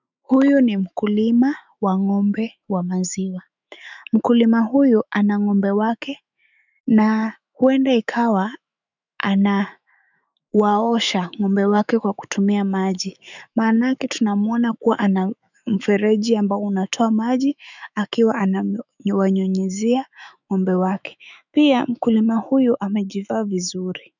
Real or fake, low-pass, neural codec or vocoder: fake; 7.2 kHz; autoencoder, 48 kHz, 128 numbers a frame, DAC-VAE, trained on Japanese speech